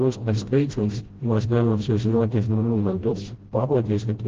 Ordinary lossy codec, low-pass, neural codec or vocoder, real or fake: Opus, 16 kbps; 7.2 kHz; codec, 16 kHz, 0.5 kbps, FreqCodec, smaller model; fake